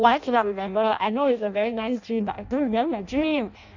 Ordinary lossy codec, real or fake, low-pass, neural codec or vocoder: none; fake; 7.2 kHz; codec, 16 kHz in and 24 kHz out, 0.6 kbps, FireRedTTS-2 codec